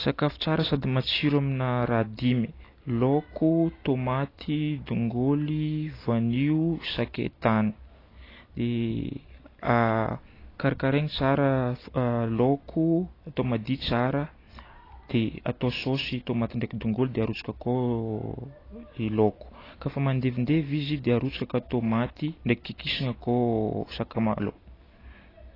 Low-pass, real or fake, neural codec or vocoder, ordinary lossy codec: 5.4 kHz; real; none; AAC, 24 kbps